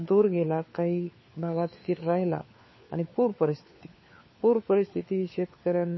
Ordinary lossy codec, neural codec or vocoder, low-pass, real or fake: MP3, 24 kbps; codec, 16 kHz, 4 kbps, FunCodec, trained on LibriTTS, 50 frames a second; 7.2 kHz; fake